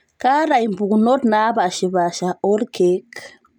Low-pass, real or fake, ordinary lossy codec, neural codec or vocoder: 19.8 kHz; real; none; none